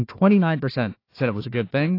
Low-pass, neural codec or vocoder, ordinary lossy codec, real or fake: 5.4 kHz; codec, 16 kHz, 1 kbps, FunCodec, trained on Chinese and English, 50 frames a second; AAC, 32 kbps; fake